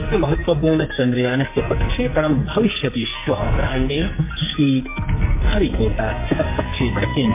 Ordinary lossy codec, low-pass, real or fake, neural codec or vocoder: MP3, 24 kbps; 3.6 kHz; fake; codec, 44.1 kHz, 2.6 kbps, SNAC